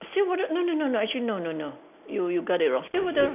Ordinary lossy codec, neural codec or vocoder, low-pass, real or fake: none; none; 3.6 kHz; real